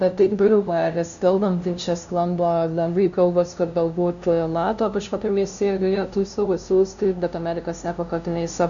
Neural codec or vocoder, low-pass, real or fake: codec, 16 kHz, 0.5 kbps, FunCodec, trained on LibriTTS, 25 frames a second; 7.2 kHz; fake